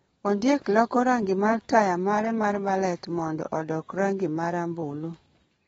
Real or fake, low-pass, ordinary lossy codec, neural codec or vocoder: fake; 19.8 kHz; AAC, 24 kbps; codec, 44.1 kHz, 7.8 kbps, DAC